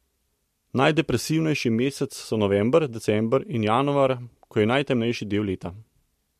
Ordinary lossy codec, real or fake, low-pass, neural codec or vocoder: MP3, 64 kbps; real; 14.4 kHz; none